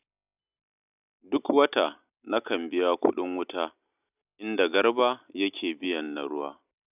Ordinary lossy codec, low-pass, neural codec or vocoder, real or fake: none; 3.6 kHz; none; real